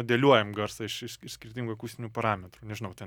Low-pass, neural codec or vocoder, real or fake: 19.8 kHz; none; real